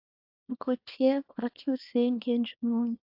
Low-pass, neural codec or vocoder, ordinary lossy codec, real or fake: 5.4 kHz; codec, 24 kHz, 0.9 kbps, WavTokenizer, small release; AAC, 48 kbps; fake